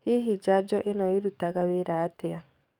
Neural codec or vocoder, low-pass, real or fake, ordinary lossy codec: codec, 44.1 kHz, 7.8 kbps, DAC; 19.8 kHz; fake; none